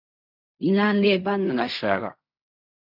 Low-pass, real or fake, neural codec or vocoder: 5.4 kHz; fake; codec, 16 kHz in and 24 kHz out, 0.4 kbps, LongCat-Audio-Codec, fine tuned four codebook decoder